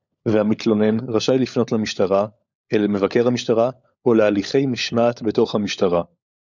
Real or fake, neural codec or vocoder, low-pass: fake; codec, 16 kHz, 16 kbps, FunCodec, trained on LibriTTS, 50 frames a second; 7.2 kHz